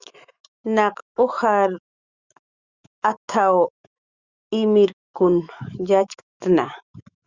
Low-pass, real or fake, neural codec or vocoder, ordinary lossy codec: 7.2 kHz; fake; autoencoder, 48 kHz, 128 numbers a frame, DAC-VAE, trained on Japanese speech; Opus, 64 kbps